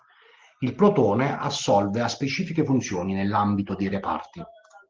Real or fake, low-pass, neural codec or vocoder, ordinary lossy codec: real; 7.2 kHz; none; Opus, 16 kbps